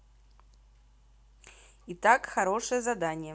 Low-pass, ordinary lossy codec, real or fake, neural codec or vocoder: none; none; real; none